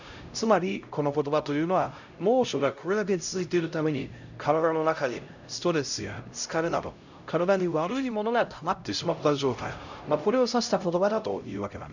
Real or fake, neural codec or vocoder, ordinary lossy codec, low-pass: fake; codec, 16 kHz, 0.5 kbps, X-Codec, HuBERT features, trained on LibriSpeech; none; 7.2 kHz